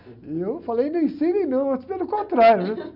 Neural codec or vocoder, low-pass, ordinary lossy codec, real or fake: none; 5.4 kHz; none; real